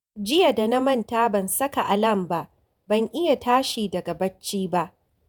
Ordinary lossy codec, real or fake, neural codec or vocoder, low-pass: none; fake; vocoder, 48 kHz, 128 mel bands, Vocos; none